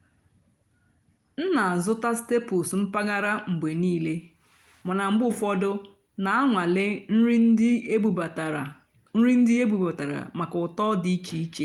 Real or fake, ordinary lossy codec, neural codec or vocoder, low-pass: real; Opus, 32 kbps; none; 19.8 kHz